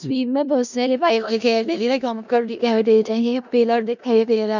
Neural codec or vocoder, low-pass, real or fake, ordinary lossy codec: codec, 16 kHz in and 24 kHz out, 0.4 kbps, LongCat-Audio-Codec, four codebook decoder; 7.2 kHz; fake; none